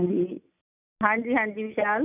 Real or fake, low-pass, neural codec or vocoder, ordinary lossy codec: real; 3.6 kHz; none; none